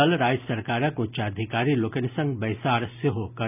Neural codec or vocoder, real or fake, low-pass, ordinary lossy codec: none; real; 3.6 kHz; none